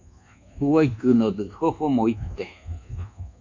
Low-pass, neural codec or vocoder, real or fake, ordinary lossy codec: 7.2 kHz; codec, 24 kHz, 1.2 kbps, DualCodec; fake; MP3, 64 kbps